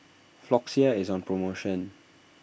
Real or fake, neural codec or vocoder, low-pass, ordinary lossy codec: real; none; none; none